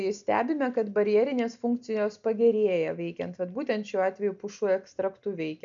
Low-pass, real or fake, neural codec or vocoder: 7.2 kHz; real; none